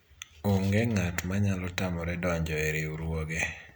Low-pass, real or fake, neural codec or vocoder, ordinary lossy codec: none; real; none; none